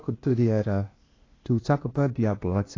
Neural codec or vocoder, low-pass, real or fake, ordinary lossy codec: codec, 16 kHz, 0.8 kbps, ZipCodec; 7.2 kHz; fake; AAC, 32 kbps